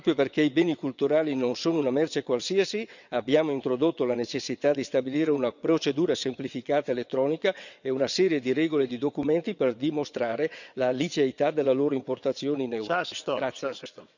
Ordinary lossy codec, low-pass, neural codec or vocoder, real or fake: none; 7.2 kHz; vocoder, 22.05 kHz, 80 mel bands, WaveNeXt; fake